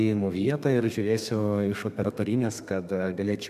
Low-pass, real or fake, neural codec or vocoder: 14.4 kHz; fake; codec, 32 kHz, 1.9 kbps, SNAC